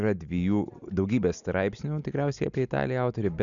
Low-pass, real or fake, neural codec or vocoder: 7.2 kHz; real; none